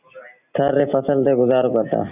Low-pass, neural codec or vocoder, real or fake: 3.6 kHz; vocoder, 44.1 kHz, 128 mel bands every 256 samples, BigVGAN v2; fake